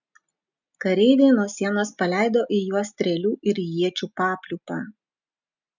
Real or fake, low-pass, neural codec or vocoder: real; 7.2 kHz; none